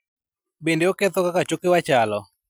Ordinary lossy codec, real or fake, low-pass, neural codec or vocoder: none; real; none; none